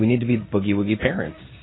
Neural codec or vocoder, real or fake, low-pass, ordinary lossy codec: codec, 16 kHz in and 24 kHz out, 1 kbps, XY-Tokenizer; fake; 7.2 kHz; AAC, 16 kbps